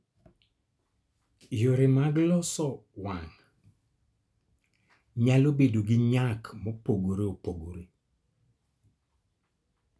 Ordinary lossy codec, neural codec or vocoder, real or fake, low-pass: none; none; real; none